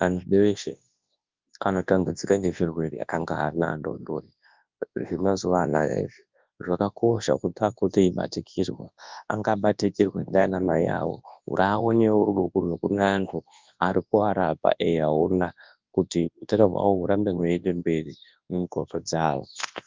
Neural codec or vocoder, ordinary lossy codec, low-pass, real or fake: codec, 24 kHz, 0.9 kbps, WavTokenizer, large speech release; Opus, 24 kbps; 7.2 kHz; fake